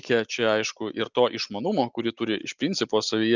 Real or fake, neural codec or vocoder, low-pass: real; none; 7.2 kHz